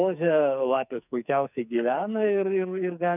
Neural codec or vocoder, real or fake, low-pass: codec, 44.1 kHz, 2.6 kbps, SNAC; fake; 3.6 kHz